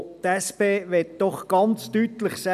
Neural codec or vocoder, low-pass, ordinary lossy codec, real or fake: none; 14.4 kHz; none; real